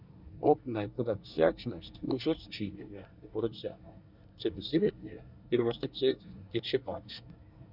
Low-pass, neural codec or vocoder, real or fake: 5.4 kHz; codec, 24 kHz, 1 kbps, SNAC; fake